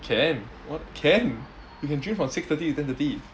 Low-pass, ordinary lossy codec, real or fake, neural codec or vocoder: none; none; real; none